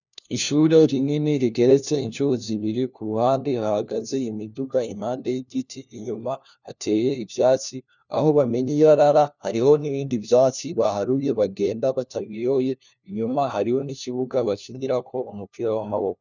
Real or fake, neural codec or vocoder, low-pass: fake; codec, 16 kHz, 1 kbps, FunCodec, trained on LibriTTS, 50 frames a second; 7.2 kHz